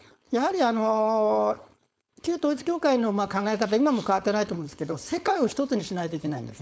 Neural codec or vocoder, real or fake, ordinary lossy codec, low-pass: codec, 16 kHz, 4.8 kbps, FACodec; fake; none; none